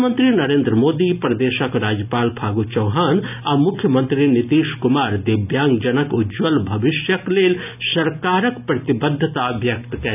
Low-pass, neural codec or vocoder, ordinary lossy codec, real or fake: 3.6 kHz; none; none; real